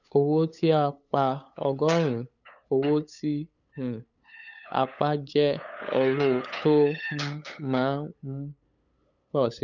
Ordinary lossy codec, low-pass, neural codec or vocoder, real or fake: none; 7.2 kHz; codec, 16 kHz, 8 kbps, FunCodec, trained on LibriTTS, 25 frames a second; fake